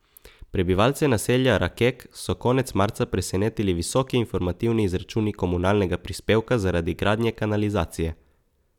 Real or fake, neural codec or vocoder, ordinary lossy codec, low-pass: real; none; none; 19.8 kHz